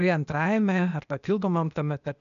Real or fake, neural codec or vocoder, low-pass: fake; codec, 16 kHz, 0.8 kbps, ZipCodec; 7.2 kHz